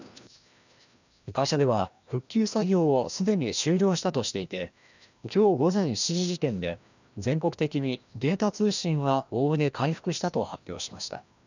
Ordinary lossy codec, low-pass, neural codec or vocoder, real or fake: none; 7.2 kHz; codec, 16 kHz, 1 kbps, FreqCodec, larger model; fake